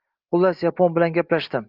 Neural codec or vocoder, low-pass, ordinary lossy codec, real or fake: none; 5.4 kHz; Opus, 32 kbps; real